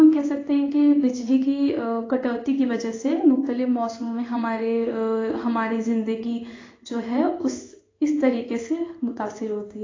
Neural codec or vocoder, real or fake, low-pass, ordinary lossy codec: codec, 16 kHz in and 24 kHz out, 1 kbps, XY-Tokenizer; fake; 7.2 kHz; AAC, 32 kbps